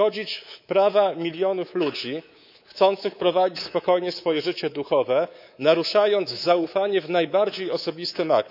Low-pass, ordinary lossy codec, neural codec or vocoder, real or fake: 5.4 kHz; none; codec, 24 kHz, 3.1 kbps, DualCodec; fake